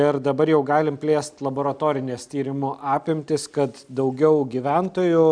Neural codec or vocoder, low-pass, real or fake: none; 9.9 kHz; real